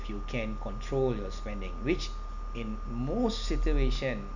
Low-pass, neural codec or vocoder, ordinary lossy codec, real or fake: 7.2 kHz; none; none; real